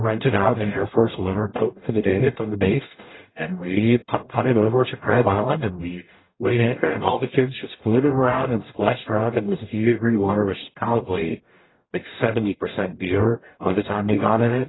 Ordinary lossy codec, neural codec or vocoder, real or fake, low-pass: AAC, 16 kbps; codec, 44.1 kHz, 0.9 kbps, DAC; fake; 7.2 kHz